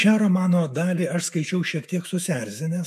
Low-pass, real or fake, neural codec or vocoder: 14.4 kHz; fake; autoencoder, 48 kHz, 128 numbers a frame, DAC-VAE, trained on Japanese speech